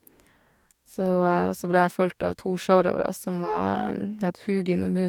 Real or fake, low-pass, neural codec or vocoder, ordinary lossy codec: fake; 19.8 kHz; codec, 44.1 kHz, 2.6 kbps, DAC; none